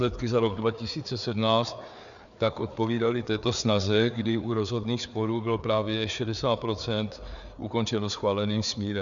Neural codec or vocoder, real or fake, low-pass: codec, 16 kHz, 4 kbps, FreqCodec, larger model; fake; 7.2 kHz